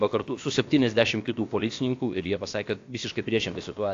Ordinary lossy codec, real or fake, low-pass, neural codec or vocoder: AAC, 48 kbps; fake; 7.2 kHz; codec, 16 kHz, about 1 kbps, DyCAST, with the encoder's durations